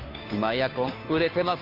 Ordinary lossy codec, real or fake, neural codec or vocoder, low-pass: AAC, 32 kbps; fake; codec, 16 kHz, 2 kbps, FunCodec, trained on Chinese and English, 25 frames a second; 5.4 kHz